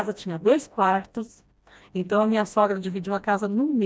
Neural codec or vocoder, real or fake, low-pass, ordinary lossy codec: codec, 16 kHz, 1 kbps, FreqCodec, smaller model; fake; none; none